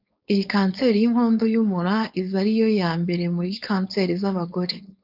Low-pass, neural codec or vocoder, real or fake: 5.4 kHz; codec, 16 kHz, 4.8 kbps, FACodec; fake